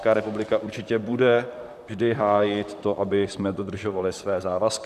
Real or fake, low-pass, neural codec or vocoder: fake; 14.4 kHz; vocoder, 44.1 kHz, 128 mel bands, Pupu-Vocoder